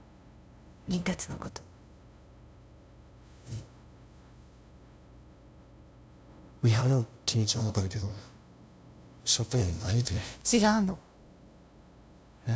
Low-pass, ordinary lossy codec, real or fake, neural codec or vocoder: none; none; fake; codec, 16 kHz, 0.5 kbps, FunCodec, trained on LibriTTS, 25 frames a second